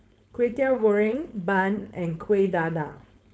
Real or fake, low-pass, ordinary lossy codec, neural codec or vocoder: fake; none; none; codec, 16 kHz, 4.8 kbps, FACodec